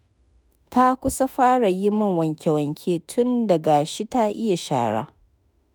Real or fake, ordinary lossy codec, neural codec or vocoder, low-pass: fake; none; autoencoder, 48 kHz, 32 numbers a frame, DAC-VAE, trained on Japanese speech; none